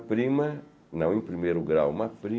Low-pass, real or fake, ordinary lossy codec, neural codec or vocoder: none; real; none; none